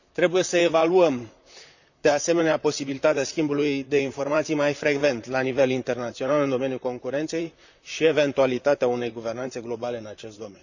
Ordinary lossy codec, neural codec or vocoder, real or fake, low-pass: none; vocoder, 44.1 kHz, 128 mel bands, Pupu-Vocoder; fake; 7.2 kHz